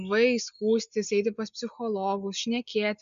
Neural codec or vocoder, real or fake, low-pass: none; real; 7.2 kHz